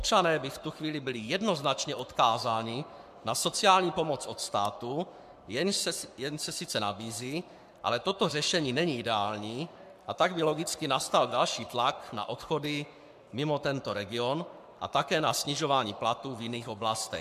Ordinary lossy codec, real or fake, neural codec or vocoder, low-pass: MP3, 96 kbps; fake; codec, 44.1 kHz, 7.8 kbps, Pupu-Codec; 14.4 kHz